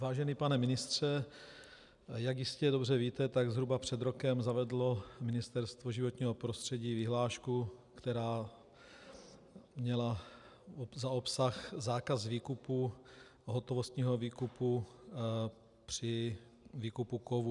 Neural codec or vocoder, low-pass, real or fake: none; 10.8 kHz; real